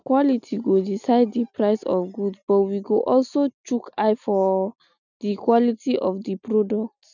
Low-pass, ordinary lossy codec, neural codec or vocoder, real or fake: 7.2 kHz; none; none; real